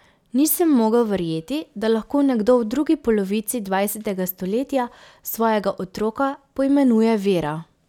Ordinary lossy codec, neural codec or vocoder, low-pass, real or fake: none; none; 19.8 kHz; real